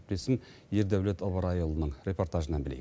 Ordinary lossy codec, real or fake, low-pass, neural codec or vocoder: none; real; none; none